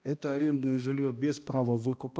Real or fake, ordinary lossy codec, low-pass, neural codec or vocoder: fake; none; none; codec, 16 kHz, 1 kbps, X-Codec, HuBERT features, trained on balanced general audio